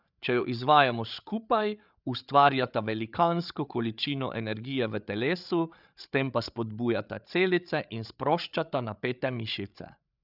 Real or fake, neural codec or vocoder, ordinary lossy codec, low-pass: fake; codec, 16 kHz, 8 kbps, FreqCodec, larger model; none; 5.4 kHz